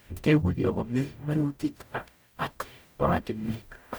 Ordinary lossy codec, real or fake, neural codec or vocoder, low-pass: none; fake; codec, 44.1 kHz, 0.9 kbps, DAC; none